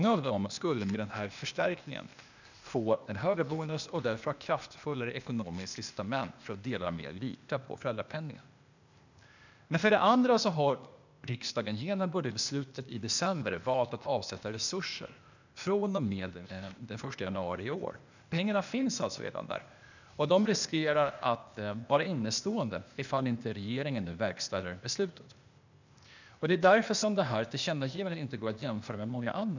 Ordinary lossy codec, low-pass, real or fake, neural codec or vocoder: none; 7.2 kHz; fake; codec, 16 kHz, 0.8 kbps, ZipCodec